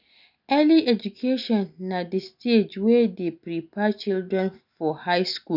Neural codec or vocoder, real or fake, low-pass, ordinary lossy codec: none; real; 5.4 kHz; none